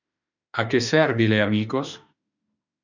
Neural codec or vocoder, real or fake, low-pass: autoencoder, 48 kHz, 32 numbers a frame, DAC-VAE, trained on Japanese speech; fake; 7.2 kHz